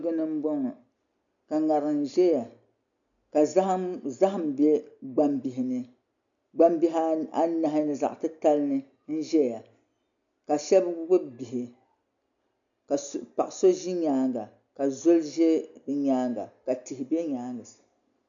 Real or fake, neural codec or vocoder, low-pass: real; none; 7.2 kHz